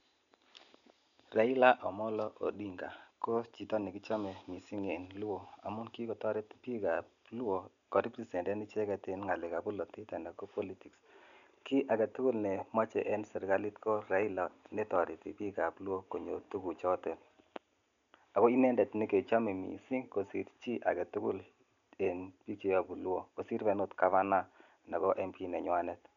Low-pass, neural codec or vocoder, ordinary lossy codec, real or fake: 7.2 kHz; none; none; real